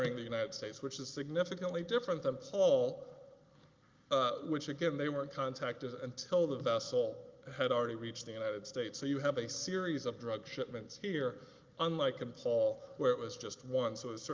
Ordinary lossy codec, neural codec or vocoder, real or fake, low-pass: Opus, 32 kbps; none; real; 7.2 kHz